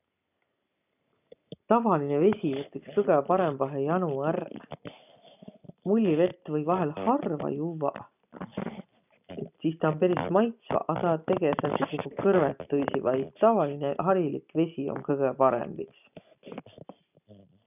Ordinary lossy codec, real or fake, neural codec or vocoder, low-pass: none; real; none; 3.6 kHz